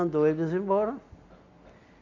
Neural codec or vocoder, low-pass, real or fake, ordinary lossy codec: none; 7.2 kHz; real; MP3, 48 kbps